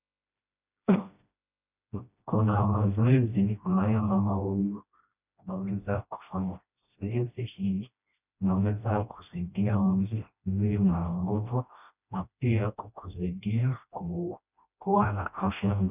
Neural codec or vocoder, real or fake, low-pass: codec, 16 kHz, 1 kbps, FreqCodec, smaller model; fake; 3.6 kHz